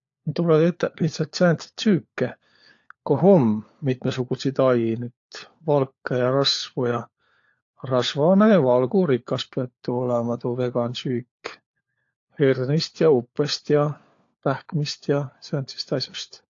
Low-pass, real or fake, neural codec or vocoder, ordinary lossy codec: 7.2 kHz; fake; codec, 16 kHz, 4 kbps, FunCodec, trained on LibriTTS, 50 frames a second; AAC, 48 kbps